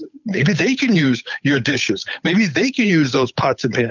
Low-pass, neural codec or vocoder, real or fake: 7.2 kHz; codec, 16 kHz, 8 kbps, FunCodec, trained on Chinese and English, 25 frames a second; fake